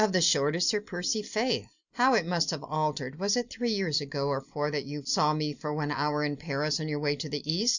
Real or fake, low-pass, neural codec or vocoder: real; 7.2 kHz; none